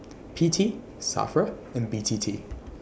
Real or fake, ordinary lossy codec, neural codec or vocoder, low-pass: real; none; none; none